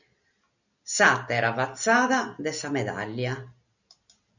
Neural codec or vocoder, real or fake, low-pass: none; real; 7.2 kHz